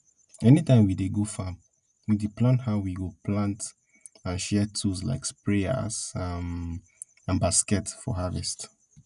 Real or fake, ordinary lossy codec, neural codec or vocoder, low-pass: real; none; none; 10.8 kHz